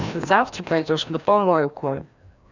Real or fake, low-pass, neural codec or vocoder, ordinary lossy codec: fake; 7.2 kHz; codec, 16 kHz, 1 kbps, FreqCodec, larger model; none